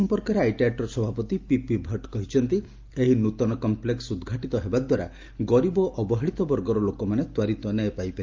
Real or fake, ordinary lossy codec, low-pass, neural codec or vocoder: real; Opus, 32 kbps; 7.2 kHz; none